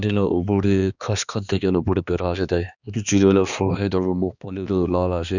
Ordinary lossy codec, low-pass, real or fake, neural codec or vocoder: none; 7.2 kHz; fake; codec, 16 kHz, 2 kbps, X-Codec, HuBERT features, trained on balanced general audio